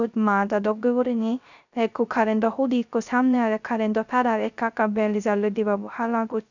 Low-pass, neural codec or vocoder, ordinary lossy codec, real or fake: 7.2 kHz; codec, 16 kHz, 0.3 kbps, FocalCodec; none; fake